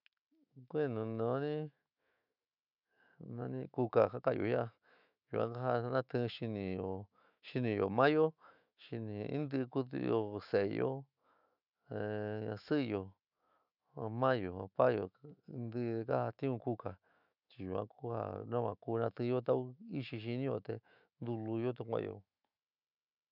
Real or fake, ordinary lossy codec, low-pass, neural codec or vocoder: fake; none; 5.4 kHz; autoencoder, 48 kHz, 128 numbers a frame, DAC-VAE, trained on Japanese speech